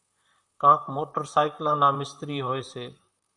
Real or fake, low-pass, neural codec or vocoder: fake; 10.8 kHz; vocoder, 44.1 kHz, 128 mel bands, Pupu-Vocoder